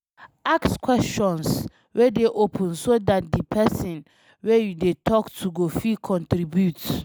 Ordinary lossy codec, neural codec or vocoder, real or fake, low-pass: none; none; real; none